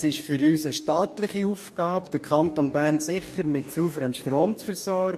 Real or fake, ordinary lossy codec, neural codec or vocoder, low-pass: fake; MP3, 64 kbps; codec, 44.1 kHz, 2.6 kbps, DAC; 14.4 kHz